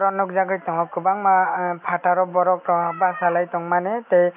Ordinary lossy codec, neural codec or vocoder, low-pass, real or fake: none; none; 3.6 kHz; real